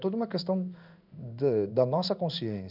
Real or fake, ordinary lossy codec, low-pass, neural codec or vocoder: fake; none; 5.4 kHz; codec, 16 kHz in and 24 kHz out, 1 kbps, XY-Tokenizer